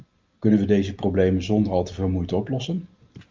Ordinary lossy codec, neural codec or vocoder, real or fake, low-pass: Opus, 24 kbps; none; real; 7.2 kHz